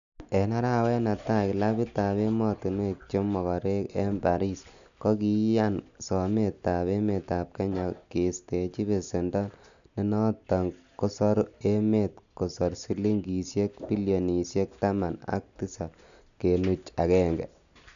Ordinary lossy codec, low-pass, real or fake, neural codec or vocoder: none; 7.2 kHz; real; none